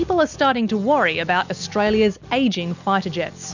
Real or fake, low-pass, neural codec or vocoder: real; 7.2 kHz; none